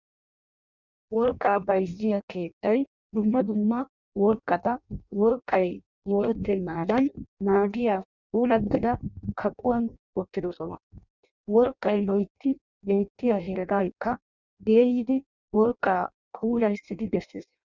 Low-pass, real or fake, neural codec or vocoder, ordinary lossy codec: 7.2 kHz; fake; codec, 16 kHz in and 24 kHz out, 0.6 kbps, FireRedTTS-2 codec; Opus, 64 kbps